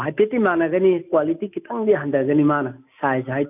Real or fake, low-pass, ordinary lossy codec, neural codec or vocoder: real; 3.6 kHz; none; none